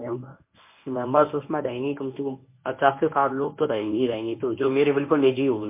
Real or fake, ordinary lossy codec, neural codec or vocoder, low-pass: fake; MP3, 24 kbps; codec, 24 kHz, 0.9 kbps, WavTokenizer, medium speech release version 1; 3.6 kHz